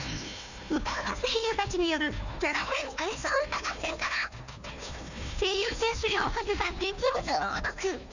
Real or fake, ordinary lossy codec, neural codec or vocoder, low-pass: fake; none; codec, 16 kHz, 1 kbps, FunCodec, trained on Chinese and English, 50 frames a second; 7.2 kHz